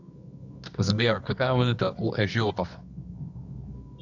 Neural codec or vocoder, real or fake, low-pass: codec, 24 kHz, 0.9 kbps, WavTokenizer, medium music audio release; fake; 7.2 kHz